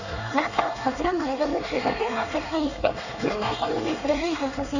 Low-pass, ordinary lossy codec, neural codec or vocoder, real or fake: 7.2 kHz; none; codec, 24 kHz, 1 kbps, SNAC; fake